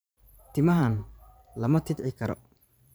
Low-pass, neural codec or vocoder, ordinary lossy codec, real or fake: none; vocoder, 44.1 kHz, 128 mel bands every 512 samples, BigVGAN v2; none; fake